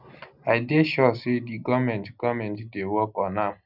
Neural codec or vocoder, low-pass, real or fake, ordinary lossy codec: none; 5.4 kHz; real; none